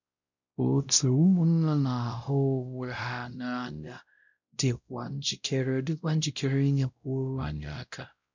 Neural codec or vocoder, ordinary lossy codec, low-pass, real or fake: codec, 16 kHz, 0.5 kbps, X-Codec, WavLM features, trained on Multilingual LibriSpeech; none; 7.2 kHz; fake